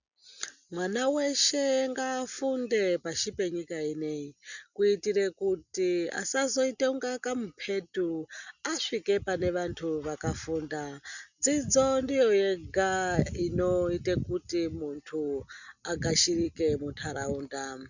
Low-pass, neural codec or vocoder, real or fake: 7.2 kHz; none; real